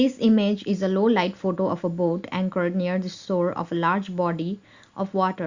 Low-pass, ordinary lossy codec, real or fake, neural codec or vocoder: 7.2 kHz; Opus, 64 kbps; real; none